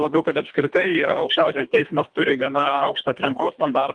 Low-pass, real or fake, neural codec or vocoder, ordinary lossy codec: 9.9 kHz; fake; codec, 24 kHz, 1.5 kbps, HILCodec; Opus, 32 kbps